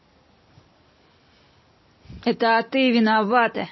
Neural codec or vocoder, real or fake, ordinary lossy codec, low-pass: none; real; MP3, 24 kbps; 7.2 kHz